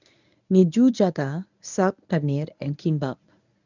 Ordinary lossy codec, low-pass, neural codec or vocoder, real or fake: none; 7.2 kHz; codec, 24 kHz, 0.9 kbps, WavTokenizer, medium speech release version 1; fake